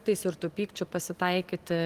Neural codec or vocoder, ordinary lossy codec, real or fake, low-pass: none; Opus, 24 kbps; real; 14.4 kHz